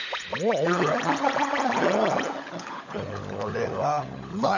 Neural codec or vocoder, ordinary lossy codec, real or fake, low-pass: codec, 16 kHz, 16 kbps, FunCodec, trained on LibriTTS, 50 frames a second; none; fake; 7.2 kHz